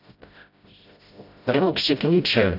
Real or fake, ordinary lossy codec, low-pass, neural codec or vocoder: fake; none; 5.4 kHz; codec, 16 kHz, 0.5 kbps, FreqCodec, smaller model